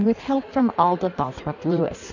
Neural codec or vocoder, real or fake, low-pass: codec, 16 kHz in and 24 kHz out, 1.1 kbps, FireRedTTS-2 codec; fake; 7.2 kHz